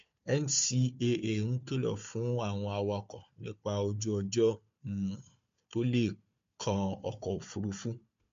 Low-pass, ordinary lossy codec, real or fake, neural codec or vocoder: 7.2 kHz; MP3, 48 kbps; fake; codec, 16 kHz, 4 kbps, FunCodec, trained on Chinese and English, 50 frames a second